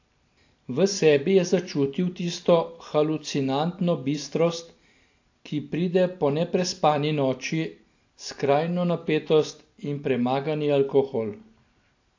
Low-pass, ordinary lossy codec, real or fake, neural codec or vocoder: 7.2 kHz; AAC, 48 kbps; real; none